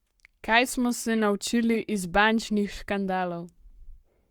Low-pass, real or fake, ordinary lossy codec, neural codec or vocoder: 19.8 kHz; fake; Opus, 64 kbps; codec, 44.1 kHz, 7.8 kbps, Pupu-Codec